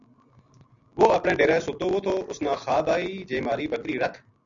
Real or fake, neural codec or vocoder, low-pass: real; none; 7.2 kHz